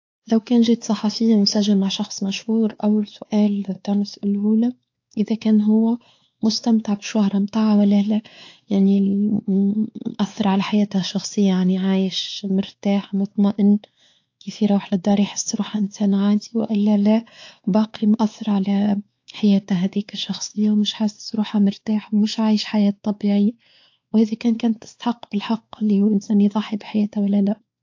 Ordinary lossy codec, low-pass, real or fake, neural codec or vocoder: AAC, 48 kbps; 7.2 kHz; fake; codec, 16 kHz, 4 kbps, X-Codec, WavLM features, trained on Multilingual LibriSpeech